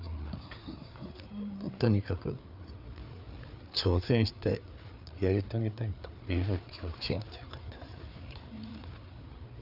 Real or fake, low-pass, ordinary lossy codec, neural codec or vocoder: fake; 5.4 kHz; none; codec, 16 kHz, 4 kbps, FreqCodec, larger model